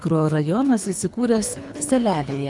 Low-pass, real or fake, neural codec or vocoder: 10.8 kHz; fake; codec, 24 kHz, 3 kbps, HILCodec